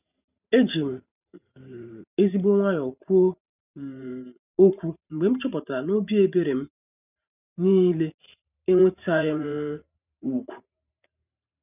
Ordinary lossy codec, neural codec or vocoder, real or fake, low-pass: none; vocoder, 44.1 kHz, 128 mel bands every 512 samples, BigVGAN v2; fake; 3.6 kHz